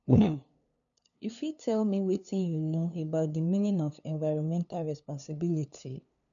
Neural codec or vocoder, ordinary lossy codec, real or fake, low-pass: codec, 16 kHz, 2 kbps, FunCodec, trained on LibriTTS, 25 frames a second; none; fake; 7.2 kHz